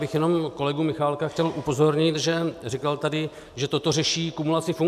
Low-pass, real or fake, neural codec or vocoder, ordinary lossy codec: 14.4 kHz; real; none; MP3, 96 kbps